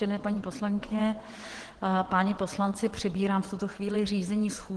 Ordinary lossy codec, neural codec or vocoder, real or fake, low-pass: Opus, 16 kbps; vocoder, 22.05 kHz, 80 mel bands, WaveNeXt; fake; 9.9 kHz